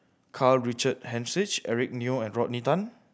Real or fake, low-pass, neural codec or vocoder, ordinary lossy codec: real; none; none; none